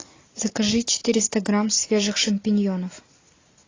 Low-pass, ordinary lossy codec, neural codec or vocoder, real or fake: 7.2 kHz; AAC, 32 kbps; none; real